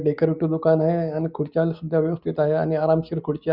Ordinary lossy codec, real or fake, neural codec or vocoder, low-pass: Opus, 64 kbps; real; none; 5.4 kHz